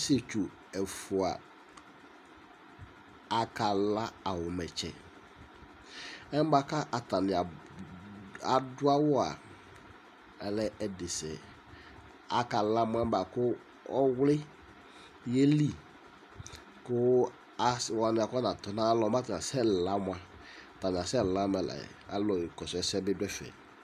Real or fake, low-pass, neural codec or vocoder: real; 14.4 kHz; none